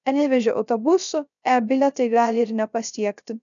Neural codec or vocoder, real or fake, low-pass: codec, 16 kHz, 0.3 kbps, FocalCodec; fake; 7.2 kHz